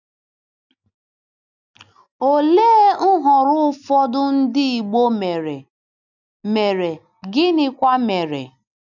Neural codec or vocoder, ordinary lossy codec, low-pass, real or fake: none; none; 7.2 kHz; real